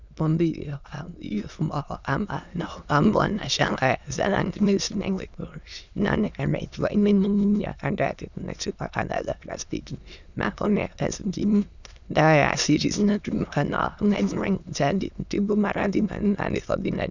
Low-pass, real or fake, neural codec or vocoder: 7.2 kHz; fake; autoencoder, 22.05 kHz, a latent of 192 numbers a frame, VITS, trained on many speakers